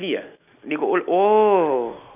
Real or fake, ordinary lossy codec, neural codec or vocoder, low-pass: real; none; none; 3.6 kHz